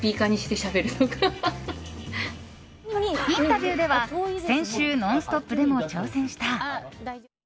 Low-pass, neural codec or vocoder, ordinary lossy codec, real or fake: none; none; none; real